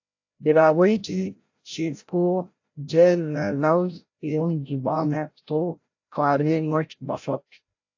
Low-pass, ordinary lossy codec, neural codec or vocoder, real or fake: 7.2 kHz; AAC, 48 kbps; codec, 16 kHz, 0.5 kbps, FreqCodec, larger model; fake